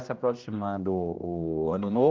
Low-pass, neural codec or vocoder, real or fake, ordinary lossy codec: 7.2 kHz; codec, 16 kHz, 1 kbps, X-Codec, HuBERT features, trained on general audio; fake; Opus, 32 kbps